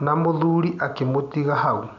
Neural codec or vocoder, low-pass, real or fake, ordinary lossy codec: none; 7.2 kHz; real; none